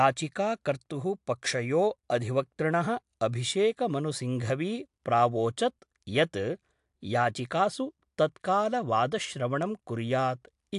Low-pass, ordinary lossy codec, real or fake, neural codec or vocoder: 10.8 kHz; AAC, 64 kbps; real; none